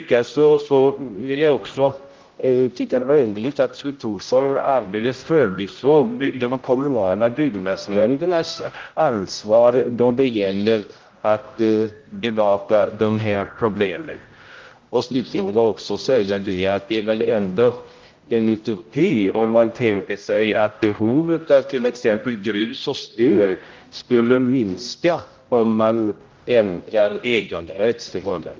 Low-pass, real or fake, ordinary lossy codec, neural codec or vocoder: 7.2 kHz; fake; Opus, 24 kbps; codec, 16 kHz, 0.5 kbps, X-Codec, HuBERT features, trained on general audio